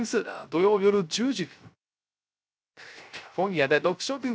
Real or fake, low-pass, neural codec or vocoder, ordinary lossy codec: fake; none; codec, 16 kHz, 0.3 kbps, FocalCodec; none